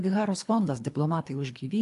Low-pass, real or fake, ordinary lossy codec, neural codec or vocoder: 10.8 kHz; fake; AAC, 64 kbps; codec, 24 kHz, 3 kbps, HILCodec